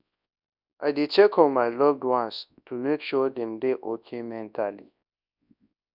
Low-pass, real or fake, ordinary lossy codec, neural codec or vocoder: 5.4 kHz; fake; none; codec, 24 kHz, 0.9 kbps, WavTokenizer, large speech release